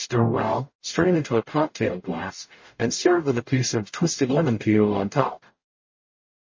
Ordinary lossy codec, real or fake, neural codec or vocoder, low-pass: MP3, 32 kbps; fake; codec, 44.1 kHz, 0.9 kbps, DAC; 7.2 kHz